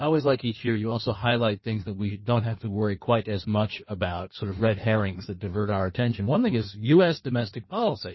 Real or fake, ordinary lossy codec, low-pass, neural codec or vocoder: fake; MP3, 24 kbps; 7.2 kHz; codec, 16 kHz in and 24 kHz out, 1.1 kbps, FireRedTTS-2 codec